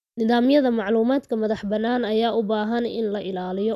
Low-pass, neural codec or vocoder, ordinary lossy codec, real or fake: 14.4 kHz; none; none; real